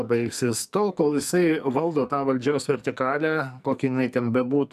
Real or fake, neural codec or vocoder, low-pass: fake; codec, 44.1 kHz, 2.6 kbps, SNAC; 14.4 kHz